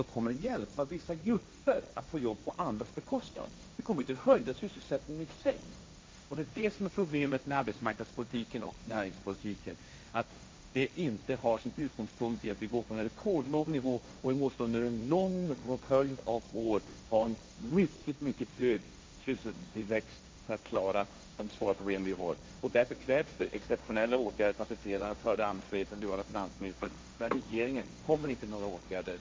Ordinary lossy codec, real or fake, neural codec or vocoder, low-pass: none; fake; codec, 16 kHz, 1.1 kbps, Voila-Tokenizer; none